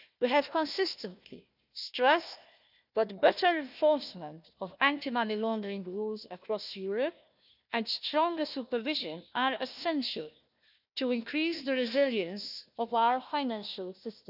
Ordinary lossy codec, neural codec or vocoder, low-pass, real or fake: none; codec, 16 kHz, 1 kbps, FunCodec, trained on Chinese and English, 50 frames a second; 5.4 kHz; fake